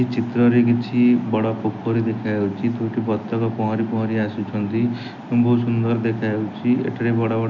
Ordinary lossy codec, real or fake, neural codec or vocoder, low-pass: none; real; none; 7.2 kHz